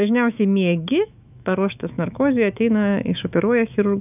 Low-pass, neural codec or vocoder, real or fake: 3.6 kHz; autoencoder, 48 kHz, 128 numbers a frame, DAC-VAE, trained on Japanese speech; fake